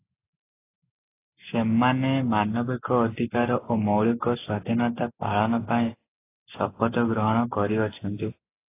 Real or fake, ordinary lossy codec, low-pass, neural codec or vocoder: real; AAC, 24 kbps; 3.6 kHz; none